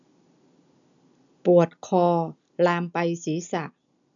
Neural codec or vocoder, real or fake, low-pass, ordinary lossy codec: none; real; 7.2 kHz; none